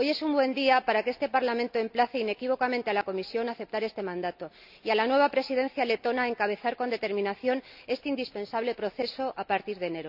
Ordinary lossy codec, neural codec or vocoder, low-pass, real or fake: none; none; 5.4 kHz; real